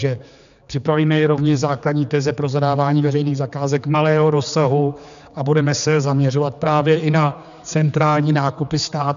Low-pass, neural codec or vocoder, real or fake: 7.2 kHz; codec, 16 kHz, 4 kbps, X-Codec, HuBERT features, trained on general audio; fake